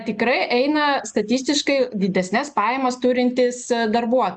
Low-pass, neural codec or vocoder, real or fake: 10.8 kHz; none; real